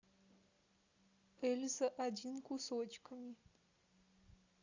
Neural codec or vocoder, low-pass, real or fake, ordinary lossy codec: none; 7.2 kHz; real; Opus, 64 kbps